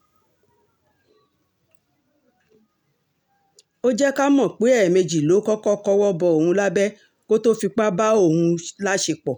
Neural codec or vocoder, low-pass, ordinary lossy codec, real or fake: none; 19.8 kHz; none; real